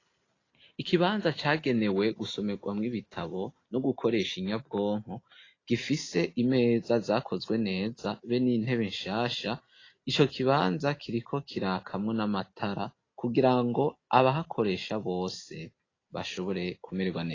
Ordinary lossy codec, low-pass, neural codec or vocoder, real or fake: AAC, 32 kbps; 7.2 kHz; none; real